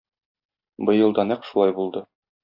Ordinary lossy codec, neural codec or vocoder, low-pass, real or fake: AAC, 48 kbps; none; 5.4 kHz; real